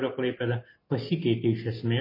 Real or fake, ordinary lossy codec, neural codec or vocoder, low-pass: real; MP3, 24 kbps; none; 5.4 kHz